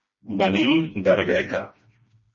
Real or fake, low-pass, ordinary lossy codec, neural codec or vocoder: fake; 7.2 kHz; MP3, 32 kbps; codec, 16 kHz, 1 kbps, FreqCodec, smaller model